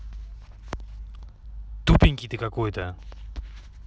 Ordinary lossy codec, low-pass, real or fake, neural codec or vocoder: none; none; real; none